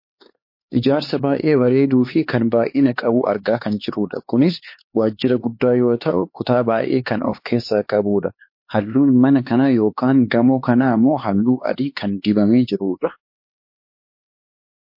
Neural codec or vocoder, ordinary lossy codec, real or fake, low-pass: codec, 16 kHz, 4 kbps, X-Codec, HuBERT features, trained on LibriSpeech; MP3, 32 kbps; fake; 5.4 kHz